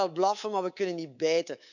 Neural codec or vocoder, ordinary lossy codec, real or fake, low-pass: codec, 24 kHz, 3.1 kbps, DualCodec; none; fake; 7.2 kHz